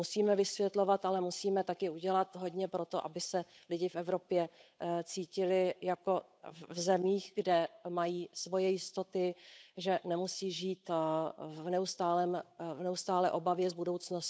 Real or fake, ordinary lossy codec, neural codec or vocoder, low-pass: fake; none; codec, 16 kHz, 8 kbps, FunCodec, trained on Chinese and English, 25 frames a second; none